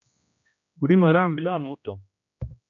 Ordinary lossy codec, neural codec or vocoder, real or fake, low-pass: MP3, 64 kbps; codec, 16 kHz, 1 kbps, X-Codec, HuBERT features, trained on general audio; fake; 7.2 kHz